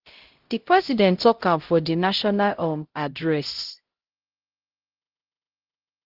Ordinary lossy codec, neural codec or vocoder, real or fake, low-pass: Opus, 16 kbps; codec, 16 kHz, 0.5 kbps, X-Codec, HuBERT features, trained on LibriSpeech; fake; 5.4 kHz